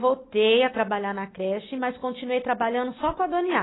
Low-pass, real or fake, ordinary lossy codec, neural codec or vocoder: 7.2 kHz; real; AAC, 16 kbps; none